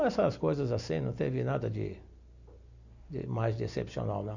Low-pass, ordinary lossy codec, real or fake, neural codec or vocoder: 7.2 kHz; none; real; none